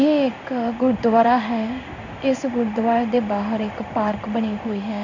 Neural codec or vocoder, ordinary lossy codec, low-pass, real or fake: codec, 16 kHz in and 24 kHz out, 1 kbps, XY-Tokenizer; none; 7.2 kHz; fake